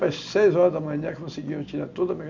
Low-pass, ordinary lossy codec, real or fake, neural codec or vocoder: 7.2 kHz; none; real; none